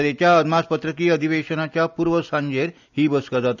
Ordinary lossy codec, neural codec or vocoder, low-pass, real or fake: none; none; 7.2 kHz; real